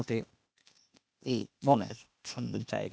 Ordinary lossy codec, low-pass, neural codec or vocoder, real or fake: none; none; codec, 16 kHz, 0.8 kbps, ZipCodec; fake